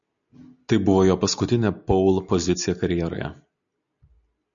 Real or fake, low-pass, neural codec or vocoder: real; 7.2 kHz; none